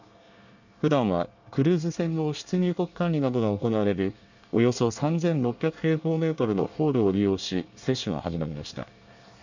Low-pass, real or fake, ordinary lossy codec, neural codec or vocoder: 7.2 kHz; fake; none; codec, 24 kHz, 1 kbps, SNAC